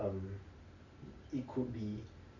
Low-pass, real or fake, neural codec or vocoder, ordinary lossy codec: 7.2 kHz; real; none; Opus, 64 kbps